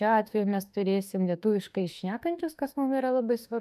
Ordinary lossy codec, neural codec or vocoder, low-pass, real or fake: MP3, 96 kbps; autoencoder, 48 kHz, 32 numbers a frame, DAC-VAE, trained on Japanese speech; 14.4 kHz; fake